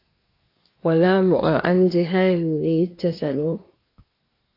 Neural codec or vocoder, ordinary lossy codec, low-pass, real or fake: codec, 24 kHz, 1 kbps, SNAC; AAC, 32 kbps; 5.4 kHz; fake